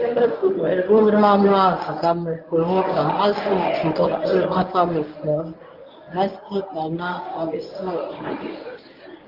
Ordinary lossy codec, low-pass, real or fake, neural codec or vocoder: Opus, 24 kbps; 5.4 kHz; fake; codec, 24 kHz, 0.9 kbps, WavTokenizer, medium speech release version 1